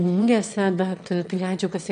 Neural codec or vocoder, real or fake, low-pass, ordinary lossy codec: autoencoder, 22.05 kHz, a latent of 192 numbers a frame, VITS, trained on one speaker; fake; 9.9 kHz; MP3, 64 kbps